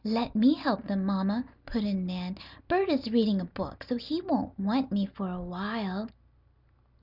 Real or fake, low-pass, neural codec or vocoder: real; 5.4 kHz; none